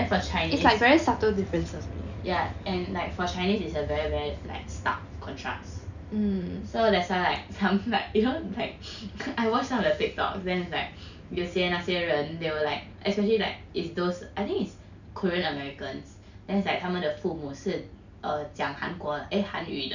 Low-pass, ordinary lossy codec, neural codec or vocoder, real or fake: 7.2 kHz; none; none; real